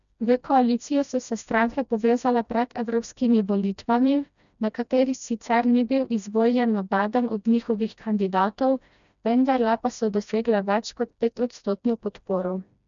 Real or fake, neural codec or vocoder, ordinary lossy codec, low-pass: fake; codec, 16 kHz, 1 kbps, FreqCodec, smaller model; none; 7.2 kHz